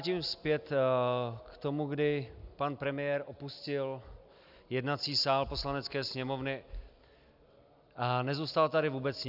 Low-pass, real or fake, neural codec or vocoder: 5.4 kHz; real; none